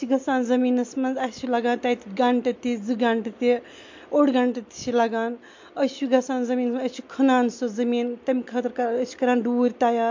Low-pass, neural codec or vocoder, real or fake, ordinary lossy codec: 7.2 kHz; none; real; MP3, 48 kbps